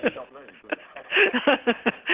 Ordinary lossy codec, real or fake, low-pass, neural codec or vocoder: Opus, 16 kbps; real; 3.6 kHz; none